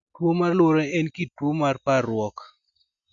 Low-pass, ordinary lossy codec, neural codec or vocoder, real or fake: 7.2 kHz; none; none; real